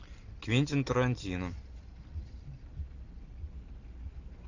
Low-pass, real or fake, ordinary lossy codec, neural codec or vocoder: 7.2 kHz; real; AAC, 48 kbps; none